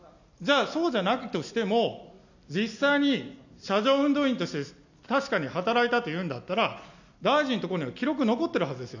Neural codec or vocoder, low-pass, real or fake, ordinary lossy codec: none; 7.2 kHz; real; none